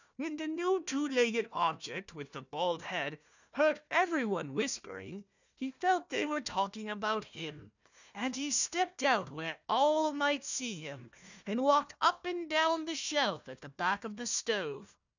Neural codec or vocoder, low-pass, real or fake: codec, 16 kHz, 1 kbps, FunCodec, trained on Chinese and English, 50 frames a second; 7.2 kHz; fake